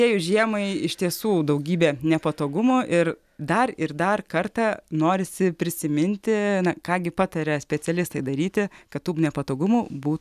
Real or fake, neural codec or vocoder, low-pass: real; none; 14.4 kHz